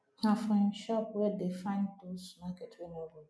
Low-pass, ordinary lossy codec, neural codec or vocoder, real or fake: none; none; none; real